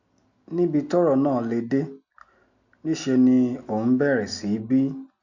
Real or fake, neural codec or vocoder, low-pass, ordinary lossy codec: real; none; 7.2 kHz; none